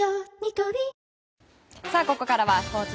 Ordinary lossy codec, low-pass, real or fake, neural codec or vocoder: none; none; real; none